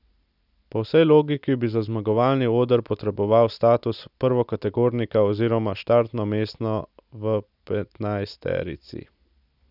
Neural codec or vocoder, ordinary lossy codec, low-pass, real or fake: none; none; 5.4 kHz; real